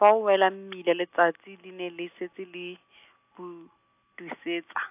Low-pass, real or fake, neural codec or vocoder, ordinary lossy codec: 3.6 kHz; real; none; AAC, 32 kbps